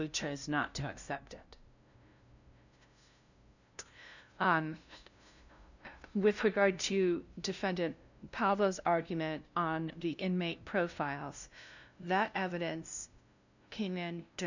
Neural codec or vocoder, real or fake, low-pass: codec, 16 kHz, 0.5 kbps, FunCodec, trained on LibriTTS, 25 frames a second; fake; 7.2 kHz